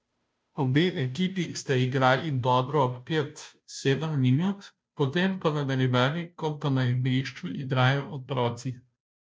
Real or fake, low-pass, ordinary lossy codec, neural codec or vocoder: fake; none; none; codec, 16 kHz, 0.5 kbps, FunCodec, trained on Chinese and English, 25 frames a second